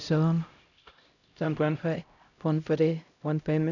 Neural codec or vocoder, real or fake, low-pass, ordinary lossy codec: codec, 16 kHz, 0.5 kbps, X-Codec, HuBERT features, trained on LibriSpeech; fake; 7.2 kHz; none